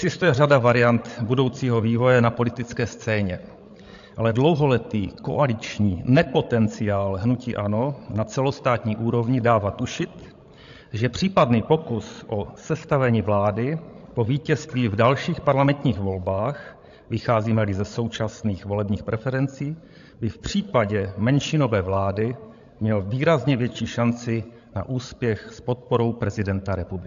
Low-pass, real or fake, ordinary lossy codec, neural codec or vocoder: 7.2 kHz; fake; AAC, 64 kbps; codec, 16 kHz, 16 kbps, FreqCodec, larger model